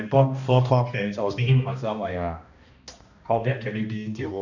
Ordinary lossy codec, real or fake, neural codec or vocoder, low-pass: none; fake; codec, 16 kHz, 1 kbps, X-Codec, HuBERT features, trained on balanced general audio; 7.2 kHz